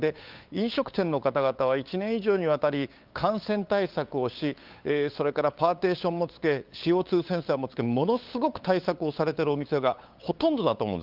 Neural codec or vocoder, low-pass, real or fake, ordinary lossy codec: codec, 16 kHz, 8 kbps, FunCodec, trained on LibriTTS, 25 frames a second; 5.4 kHz; fake; Opus, 32 kbps